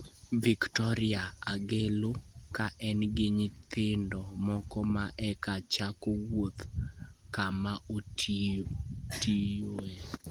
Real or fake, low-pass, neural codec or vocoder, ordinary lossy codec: real; 19.8 kHz; none; Opus, 24 kbps